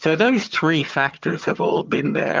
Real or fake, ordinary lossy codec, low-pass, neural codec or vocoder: fake; Opus, 24 kbps; 7.2 kHz; vocoder, 22.05 kHz, 80 mel bands, HiFi-GAN